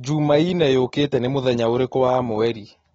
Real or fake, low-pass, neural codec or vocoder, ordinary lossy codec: real; 19.8 kHz; none; AAC, 24 kbps